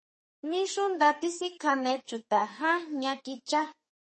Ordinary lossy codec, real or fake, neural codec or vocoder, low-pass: MP3, 32 kbps; fake; codec, 32 kHz, 1.9 kbps, SNAC; 10.8 kHz